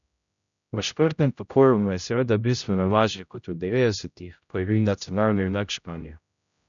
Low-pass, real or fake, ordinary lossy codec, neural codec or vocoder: 7.2 kHz; fake; none; codec, 16 kHz, 0.5 kbps, X-Codec, HuBERT features, trained on general audio